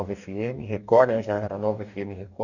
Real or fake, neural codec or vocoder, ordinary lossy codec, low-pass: fake; codec, 44.1 kHz, 2.6 kbps, DAC; none; 7.2 kHz